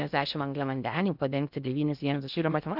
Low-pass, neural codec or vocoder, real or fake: 5.4 kHz; codec, 16 kHz in and 24 kHz out, 0.6 kbps, FocalCodec, streaming, 2048 codes; fake